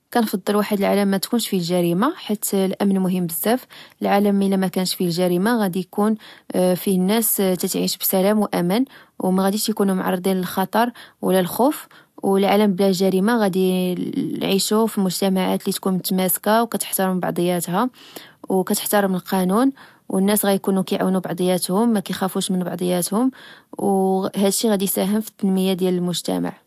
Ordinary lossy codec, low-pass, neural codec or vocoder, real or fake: AAC, 96 kbps; 14.4 kHz; none; real